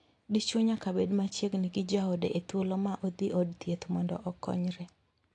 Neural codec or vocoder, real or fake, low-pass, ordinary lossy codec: none; real; 10.8 kHz; AAC, 48 kbps